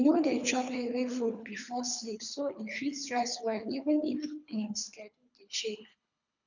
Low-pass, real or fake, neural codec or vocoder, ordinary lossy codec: 7.2 kHz; fake; codec, 24 kHz, 3 kbps, HILCodec; none